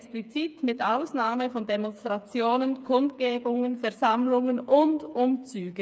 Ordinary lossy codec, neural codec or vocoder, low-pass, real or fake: none; codec, 16 kHz, 4 kbps, FreqCodec, smaller model; none; fake